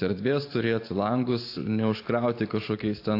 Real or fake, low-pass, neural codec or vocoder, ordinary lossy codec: real; 5.4 kHz; none; AAC, 32 kbps